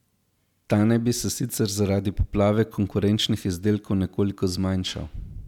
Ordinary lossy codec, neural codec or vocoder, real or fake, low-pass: none; none; real; 19.8 kHz